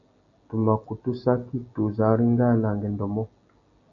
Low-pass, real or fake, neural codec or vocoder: 7.2 kHz; real; none